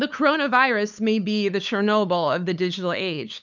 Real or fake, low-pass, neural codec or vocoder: fake; 7.2 kHz; codec, 16 kHz, 8 kbps, FunCodec, trained on LibriTTS, 25 frames a second